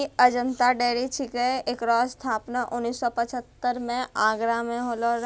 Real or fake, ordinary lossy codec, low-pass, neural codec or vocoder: real; none; none; none